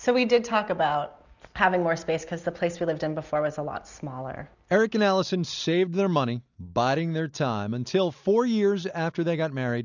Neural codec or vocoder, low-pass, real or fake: none; 7.2 kHz; real